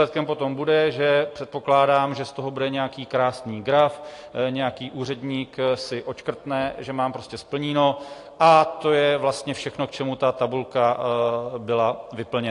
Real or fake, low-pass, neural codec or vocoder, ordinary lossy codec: real; 10.8 kHz; none; AAC, 48 kbps